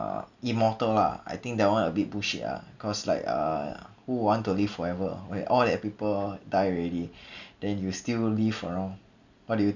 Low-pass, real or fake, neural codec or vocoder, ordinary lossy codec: 7.2 kHz; real; none; none